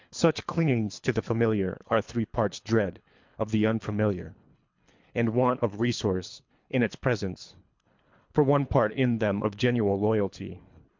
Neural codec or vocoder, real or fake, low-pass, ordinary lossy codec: codec, 24 kHz, 3 kbps, HILCodec; fake; 7.2 kHz; MP3, 64 kbps